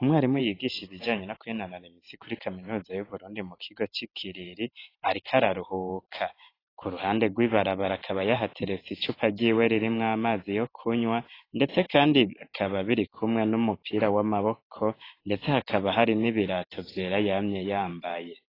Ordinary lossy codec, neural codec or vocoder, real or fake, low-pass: AAC, 24 kbps; vocoder, 44.1 kHz, 128 mel bands every 512 samples, BigVGAN v2; fake; 5.4 kHz